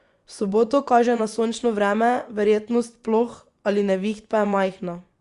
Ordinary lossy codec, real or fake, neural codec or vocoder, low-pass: Opus, 64 kbps; fake; vocoder, 24 kHz, 100 mel bands, Vocos; 10.8 kHz